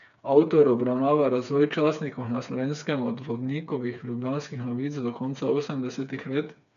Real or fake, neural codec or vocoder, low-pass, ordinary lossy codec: fake; codec, 16 kHz, 4 kbps, FreqCodec, smaller model; 7.2 kHz; none